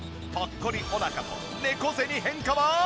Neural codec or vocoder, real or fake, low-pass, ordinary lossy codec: none; real; none; none